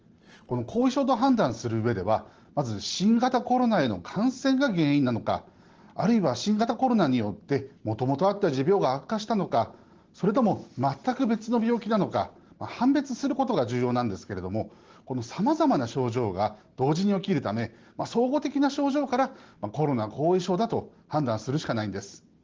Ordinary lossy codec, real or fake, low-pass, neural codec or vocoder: Opus, 16 kbps; real; 7.2 kHz; none